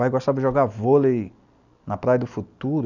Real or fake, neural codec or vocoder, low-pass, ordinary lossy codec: real; none; 7.2 kHz; none